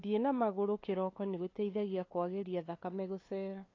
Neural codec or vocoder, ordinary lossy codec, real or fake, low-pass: codec, 16 kHz, 8 kbps, FunCodec, trained on LibriTTS, 25 frames a second; AAC, 32 kbps; fake; 7.2 kHz